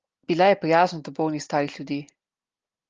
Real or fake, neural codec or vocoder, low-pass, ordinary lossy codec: real; none; 7.2 kHz; Opus, 24 kbps